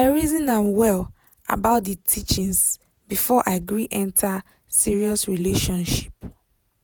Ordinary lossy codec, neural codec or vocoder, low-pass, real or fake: none; vocoder, 48 kHz, 128 mel bands, Vocos; none; fake